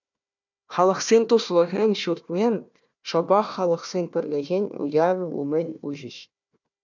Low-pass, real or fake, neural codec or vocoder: 7.2 kHz; fake; codec, 16 kHz, 1 kbps, FunCodec, trained on Chinese and English, 50 frames a second